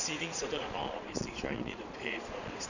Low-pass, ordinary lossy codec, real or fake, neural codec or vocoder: 7.2 kHz; none; fake; vocoder, 22.05 kHz, 80 mel bands, Vocos